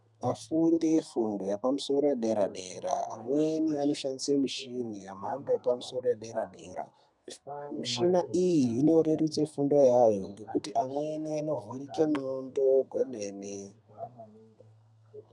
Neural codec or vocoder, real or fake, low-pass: codec, 32 kHz, 1.9 kbps, SNAC; fake; 10.8 kHz